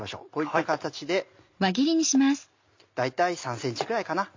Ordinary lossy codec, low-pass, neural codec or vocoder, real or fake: MP3, 48 kbps; 7.2 kHz; none; real